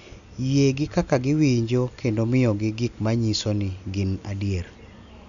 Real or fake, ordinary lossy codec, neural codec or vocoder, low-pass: real; none; none; 7.2 kHz